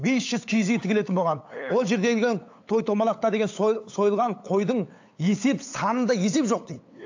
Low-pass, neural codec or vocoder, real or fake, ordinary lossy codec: 7.2 kHz; codec, 16 kHz, 8 kbps, FunCodec, trained on LibriTTS, 25 frames a second; fake; AAC, 48 kbps